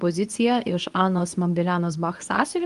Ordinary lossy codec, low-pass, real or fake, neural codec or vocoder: Opus, 32 kbps; 10.8 kHz; fake; codec, 24 kHz, 0.9 kbps, WavTokenizer, medium speech release version 2